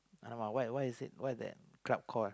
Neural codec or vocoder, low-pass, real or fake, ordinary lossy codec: none; none; real; none